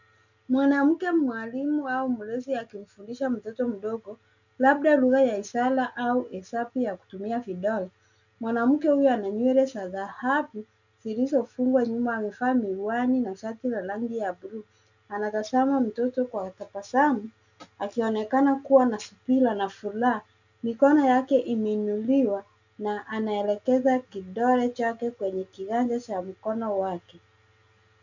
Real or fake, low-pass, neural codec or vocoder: real; 7.2 kHz; none